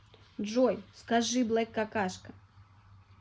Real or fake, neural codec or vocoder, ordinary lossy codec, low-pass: real; none; none; none